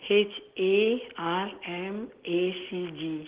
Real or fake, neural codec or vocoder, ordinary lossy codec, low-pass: real; none; Opus, 16 kbps; 3.6 kHz